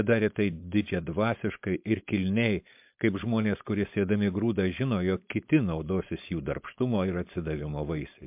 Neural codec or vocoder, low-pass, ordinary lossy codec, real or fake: codec, 16 kHz, 4.8 kbps, FACodec; 3.6 kHz; MP3, 32 kbps; fake